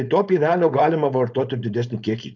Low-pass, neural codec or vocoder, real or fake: 7.2 kHz; codec, 16 kHz, 4.8 kbps, FACodec; fake